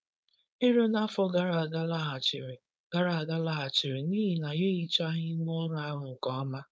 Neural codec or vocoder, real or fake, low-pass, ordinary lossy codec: codec, 16 kHz, 4.8 kbps, FACodec; fake; none; none